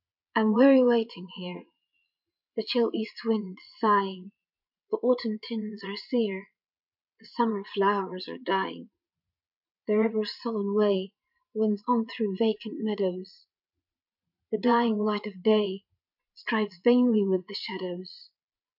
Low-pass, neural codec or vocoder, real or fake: 5.4 kHz; vocoder, 22.05 kHz, 80 mel bands, Vocos; fake